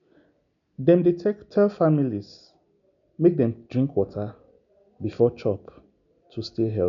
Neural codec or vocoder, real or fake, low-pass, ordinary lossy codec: none; real; 7.2 kHz; none